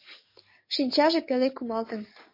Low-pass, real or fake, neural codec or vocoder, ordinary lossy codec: 5.4 kHz; fake; codec, 44.1 kHz, 7.8 kbps, DAC; MP3, 32 kbps